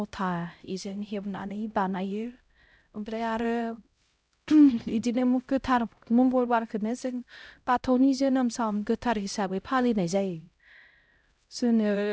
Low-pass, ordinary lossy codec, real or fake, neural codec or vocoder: none; none; fake; codec, 16 kHz, 0.5 kbps, X-Codec, HuBERT features, trained on LibriSpeech